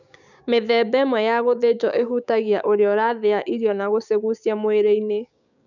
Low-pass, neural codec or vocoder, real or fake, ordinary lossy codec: 7.2 kHz; codec, 16 kHz, 6 kbps, DAC; fake; none